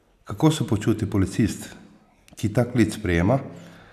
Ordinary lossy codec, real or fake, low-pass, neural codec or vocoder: none; real; 14.4 kHz; none